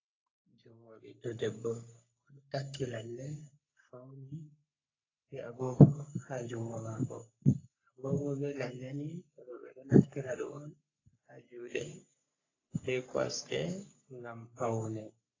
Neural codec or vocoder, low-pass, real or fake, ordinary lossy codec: codec, 44.1 kHz, 3.4 kbps, Pupu-Codec; 7.2 kHz; fake; AAC, 32 kbps